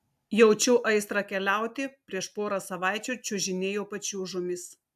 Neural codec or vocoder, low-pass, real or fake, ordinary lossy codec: none; 14.4 kHz; real; AAC, 96 kbps